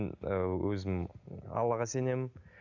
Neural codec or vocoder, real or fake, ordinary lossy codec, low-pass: none; real; none; 7.2 kHz